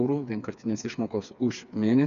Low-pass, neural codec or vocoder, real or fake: 7.2 kHz; codec, 16 kHz, 4 kbps, FreqCodec, smaller model; fake